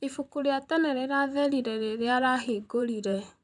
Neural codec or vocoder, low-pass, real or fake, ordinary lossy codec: vocoder, 44.1 kHz, 128 mel bands, Pupu-Vocoder; 10.8 kHz; fake; none